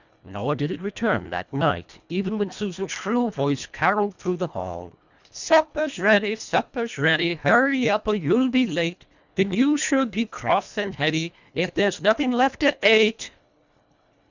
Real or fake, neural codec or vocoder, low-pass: fake; codec, 24 kHz, 1.5 kbps, HILCodec; 7.2 kHz